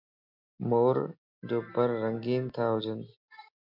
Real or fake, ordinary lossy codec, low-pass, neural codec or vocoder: real; MP3, 48 kbps; 5.4 kHz; none